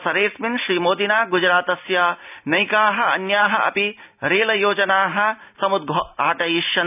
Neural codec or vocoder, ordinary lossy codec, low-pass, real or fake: none; none; 3.6 kHz; real